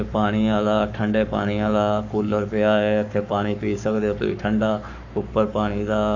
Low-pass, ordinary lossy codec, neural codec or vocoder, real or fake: 7.2 kHz; none; codec, 44.1 kHz, 7.8 kbps, Pupu-Codec; fake